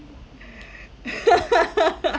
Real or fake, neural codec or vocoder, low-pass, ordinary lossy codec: real; none; none; none